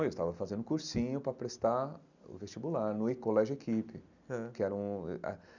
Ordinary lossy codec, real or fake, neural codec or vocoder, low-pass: none; real; none; 7.2 kHz